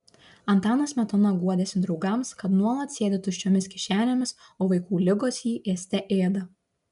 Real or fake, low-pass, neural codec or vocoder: real; 10.8 kHz; none